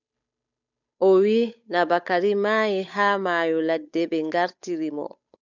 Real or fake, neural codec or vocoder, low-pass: fake; codec, 16 kHz, 8 kbps, FunCodec, trained on Chinese and English, 25 frames a second; 7.2 kHz